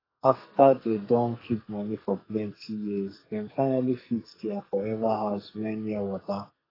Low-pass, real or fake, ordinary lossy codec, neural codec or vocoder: 5.4 kHz; fake; AAC, 24 kbps; codec, 44.1 kHz, 2.6 kbps, SNAC